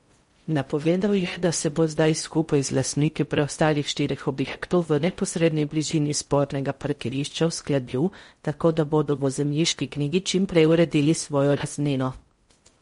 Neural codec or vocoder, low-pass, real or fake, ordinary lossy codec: codec, 16 kHz in and 24 kHz out, 0.6 kbps, FocalCodec, streaming, 4096 codes; 10.8 kHz; fake; MP3, 48 kbps